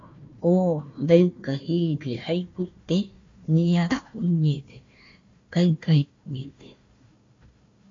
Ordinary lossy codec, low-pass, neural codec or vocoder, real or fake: AAC, 48 kbps; 7.2 kHz; codec, 16 kHz, 1 kbps, FunCodec, trained on Chinese and English, 50 frames a second; fake